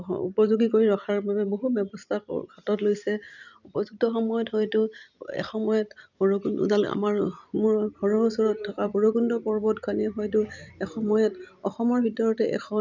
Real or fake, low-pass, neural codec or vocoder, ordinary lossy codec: fake; 7.2 kHz; vocoder, 44.1 kHz, 128 mel bands every 256 samples, BigVGAN v2; none